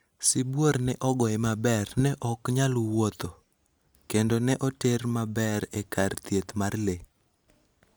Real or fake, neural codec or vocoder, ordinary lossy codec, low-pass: real; none; none; none